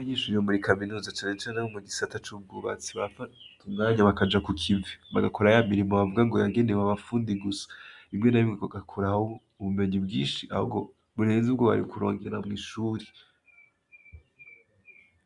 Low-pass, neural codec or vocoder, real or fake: 10.8 kHz; none; real